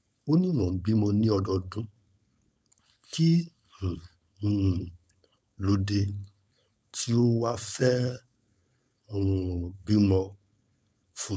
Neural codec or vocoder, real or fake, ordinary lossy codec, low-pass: codec, 16 kHz, 4.8 kbps, FACodec; fake; none; none